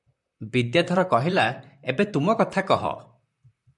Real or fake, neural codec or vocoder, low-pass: fake; vocoder, 44.1 kHz, 128 mel bands, Pupu-Vocoder; 10.8 kHz